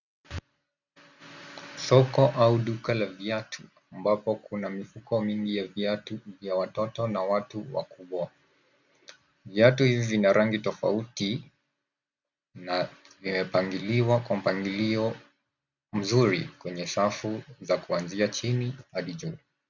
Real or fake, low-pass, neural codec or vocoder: real; 7.2 kHz; none